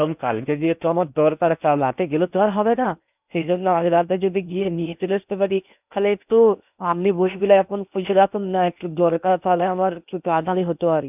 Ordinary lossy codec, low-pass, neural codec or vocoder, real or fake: none; 3.6 kHz; codec, 16 kHz in and 24 kHz out, 0.8 kbps, FocalCodec, streaming, 65536 codes; fake